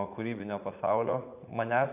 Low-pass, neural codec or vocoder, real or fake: 3.6 kHz; vocoder, 44.1 kHz, 80 mel bands, Vocos; fake